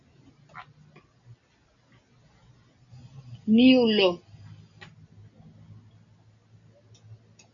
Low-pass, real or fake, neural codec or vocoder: 7.2 kHz; real; none